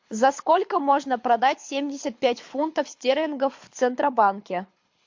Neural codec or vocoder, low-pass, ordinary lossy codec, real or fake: codec, 24 kHz, 6 kbps, HILCodec; 7.2 kHz; MP3, 48 kbps; fake